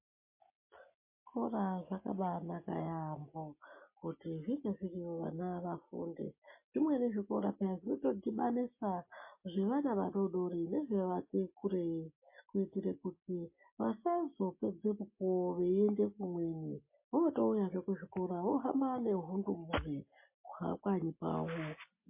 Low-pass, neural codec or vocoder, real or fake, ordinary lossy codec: 3.6 kHz; none; real; MP3, 24 kbps